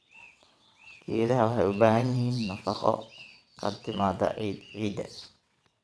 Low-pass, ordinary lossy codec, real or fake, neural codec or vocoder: none; none; fake; vocoder, 22.05 kHz, 80 mel bands, WaveNeXt